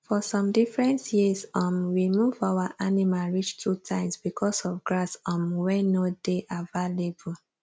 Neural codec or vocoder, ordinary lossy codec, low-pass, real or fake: none; none; none; real